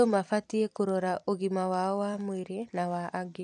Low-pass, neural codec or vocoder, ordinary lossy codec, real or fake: 10.8 kHz; vocoder, 44.1 kHz, 128 mel bands every 256 samples, BigVGAN v2; none; fake